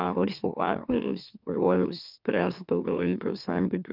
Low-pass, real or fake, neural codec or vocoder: 5.4 kHz; fake; autoencoder, 44.1 kHz, a latent of 192 numbers a frame, MeloTTS